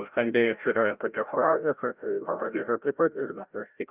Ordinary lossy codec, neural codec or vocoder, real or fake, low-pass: Opus, 24 kbps; codec, 16 kHz, 0.5 kbps, FreqCodec, larger model; fake; 3.6 kHz